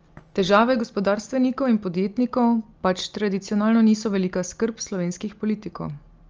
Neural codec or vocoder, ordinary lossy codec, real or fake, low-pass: none; Opus, 24 kbps; real; 7.2 kHz